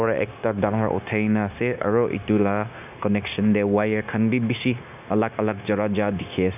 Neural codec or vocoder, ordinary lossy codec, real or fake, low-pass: codec, 16 kHz, 0.9 kbps, LongCat-Audio-Codec; none; fake; 3.6 kHz